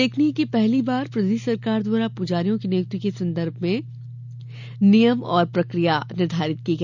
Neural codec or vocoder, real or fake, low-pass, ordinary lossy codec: none; real; 7.2 kHz; none